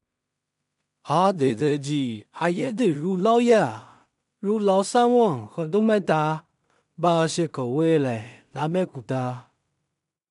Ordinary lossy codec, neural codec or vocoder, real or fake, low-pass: none; codec, 16 kHz in and 24 kHz out, 0.4 kbps, LongCat-Audio-Codec, two codebook decoder; fake; 10.8 kHz